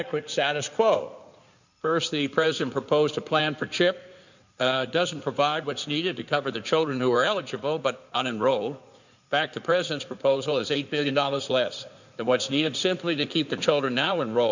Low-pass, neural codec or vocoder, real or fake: 7.2 kHz; codec, 16 kHz in and 24 kHz out, 2.2 kbps, FireRedTTS-2 codec; fake